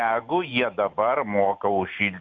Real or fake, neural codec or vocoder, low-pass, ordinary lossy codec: real; none; 7.2 kHz; MP3, 64 kbps